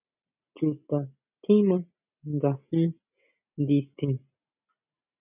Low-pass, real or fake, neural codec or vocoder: 3.6 kHz; real; none